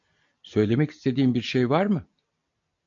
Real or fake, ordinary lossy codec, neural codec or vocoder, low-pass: real; MP3, 64 kbps; none; 7.2 kHz